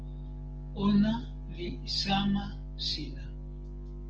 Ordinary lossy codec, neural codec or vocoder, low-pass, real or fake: Opus, 16 kbps; none; 7.2 kHz; real